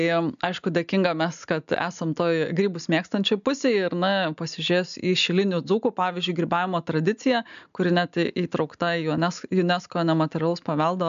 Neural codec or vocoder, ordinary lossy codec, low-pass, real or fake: none; AAC, 96 kbps; 7.2 kHz; real